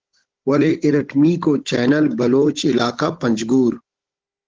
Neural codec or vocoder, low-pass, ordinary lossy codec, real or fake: codec, 16 kHz, 16 kbps, FunCodec, trained on Chinese and English, 50 frames a second; 7.2 kHz; Opus, 16 kbps; fake